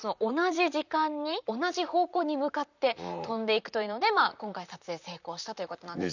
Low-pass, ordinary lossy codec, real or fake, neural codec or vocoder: 7.2 kHz; none; fake; vocoder, 44.1 kHz, 128 mel bands, Pupu-Vocoder